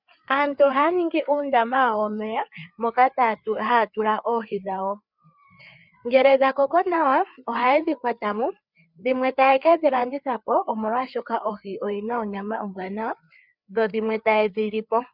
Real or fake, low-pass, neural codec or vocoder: fake; 5.4 kHz; codec, 16 kHz, 4 kbps, FreqCodec, larger model